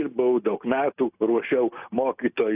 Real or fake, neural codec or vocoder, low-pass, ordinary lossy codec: real; none; 3.6 kHz; AAC, 32 kbps